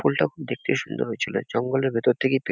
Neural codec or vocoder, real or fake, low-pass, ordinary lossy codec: none; real; none; none